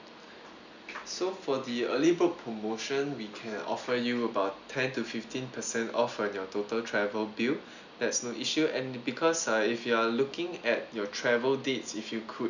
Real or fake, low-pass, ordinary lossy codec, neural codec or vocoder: real; 7.2 kHz; none; none